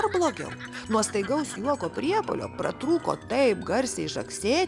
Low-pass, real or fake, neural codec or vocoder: 10.8 kHz; real; none